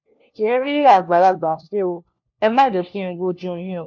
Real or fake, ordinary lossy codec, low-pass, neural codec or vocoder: fake; MP3, 48 kbps; 7.2 kHz; codec, 16 kHz, 1 kbps, FunCodec, trained on LibriTTS, 50 frames a second